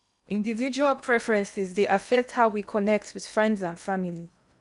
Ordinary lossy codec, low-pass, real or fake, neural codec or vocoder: none; 10.8 kHz; fake; codec, 16 kHz in and 24 kHz out, 0.8 kbps, FocalCodec, streaming, 65536 codes